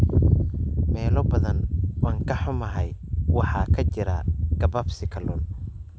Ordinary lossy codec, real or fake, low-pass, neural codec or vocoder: none; real; none; none